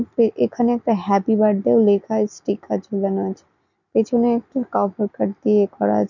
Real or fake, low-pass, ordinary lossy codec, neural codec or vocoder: real; 7.2 kHz; none; none